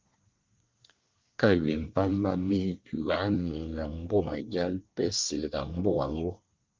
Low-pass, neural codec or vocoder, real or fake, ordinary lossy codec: 7.2 kHz; codec, 24 kHz, 1 kbps, SNAC; fake; Opus, 32 kbps